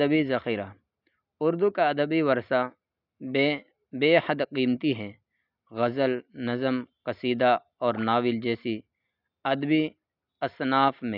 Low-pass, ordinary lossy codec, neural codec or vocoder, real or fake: 5.4 kHz; none; none; real